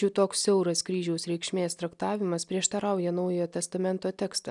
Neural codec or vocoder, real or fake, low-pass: none; real; 10.8 kHz